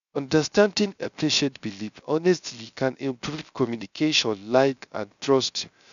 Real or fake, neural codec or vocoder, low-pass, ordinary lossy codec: fake; codec, 16 kHz, 0.3 kbps, FocalCodec; 7.2 kHz; none